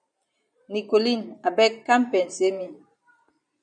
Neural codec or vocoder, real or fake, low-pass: vocoder, 44.1 kHz, 128 mel bands every 512 samples, BigVGAN v2; fake; 9.9 kHz